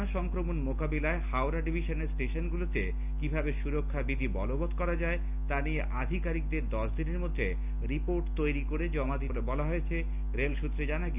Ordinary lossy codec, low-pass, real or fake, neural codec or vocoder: none; 3.6 kHz; real; none